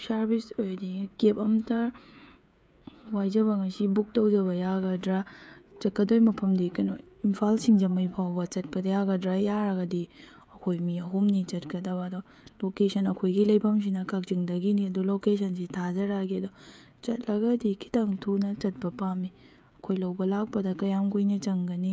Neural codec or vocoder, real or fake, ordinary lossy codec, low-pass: codec, 16 kHz, 16 kbps, FreqCodec, smaller model; fake; none; none